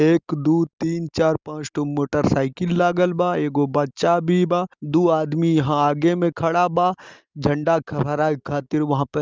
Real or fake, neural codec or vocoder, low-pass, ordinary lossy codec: real; none; 7.2 kHz; Opus, 32 kbps